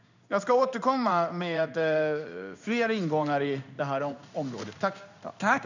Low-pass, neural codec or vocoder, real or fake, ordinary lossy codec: 7.2 kHz; codec, 16 kHz in and 24 kHz out, 1 kbps, XY-Tokenizer; fake; none